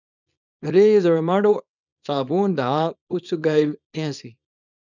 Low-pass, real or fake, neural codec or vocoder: 7.2 kHz; fake; codec, 24 kHz, 0.9 kbps, WavTokenizer, small release